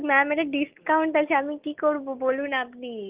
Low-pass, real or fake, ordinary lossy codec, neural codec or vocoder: 3.6 kHz; real; Opus, 32 kbps; none